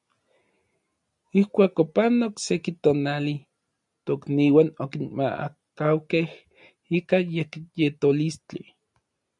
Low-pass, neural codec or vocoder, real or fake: 10.8 kHz; none; real